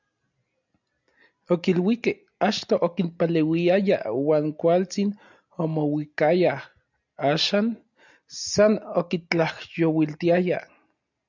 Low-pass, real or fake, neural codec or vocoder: 7.2 kHz; real; none